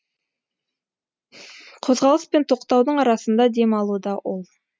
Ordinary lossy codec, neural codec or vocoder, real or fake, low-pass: none; none; real; none